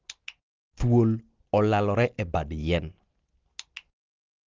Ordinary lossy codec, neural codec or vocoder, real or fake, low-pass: Opus, 16 kbps; none; real; 7.2 kHz